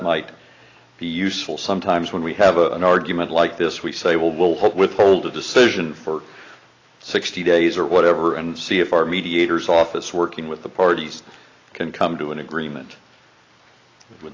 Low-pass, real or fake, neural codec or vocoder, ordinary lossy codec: 7.2 kHz; real; none; AAC, 32 kbps